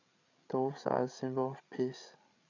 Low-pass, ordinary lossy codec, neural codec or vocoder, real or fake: 7.2 kHz; none; codec, 16 kHz, 8 kbps, FreqCodec, larger model; fake